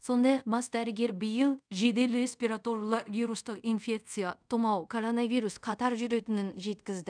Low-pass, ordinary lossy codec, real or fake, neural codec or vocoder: 9.9 kHz; none; fake; codec, 24 kHz, 0.5 kbps, DualCodec